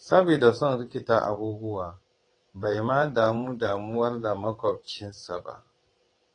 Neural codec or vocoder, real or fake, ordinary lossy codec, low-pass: vocoder, 22.05 kHz, 80 mel bands, WaveNeXt; fake; AAC, 32 kbps; 9.9 kHz